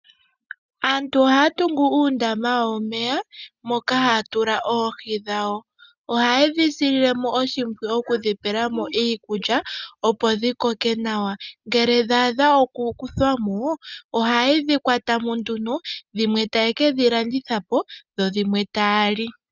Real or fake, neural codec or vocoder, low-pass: real; none; 7.2 kHz